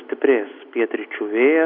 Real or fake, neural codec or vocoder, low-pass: real; none; 5.4 kHz